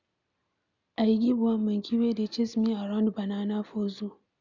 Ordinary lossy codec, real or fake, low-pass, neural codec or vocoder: none; real; 7.2 kHz; none